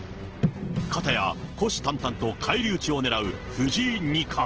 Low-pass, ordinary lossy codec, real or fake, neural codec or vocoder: 7.2 kHz; Opus, 16 kbps; real; none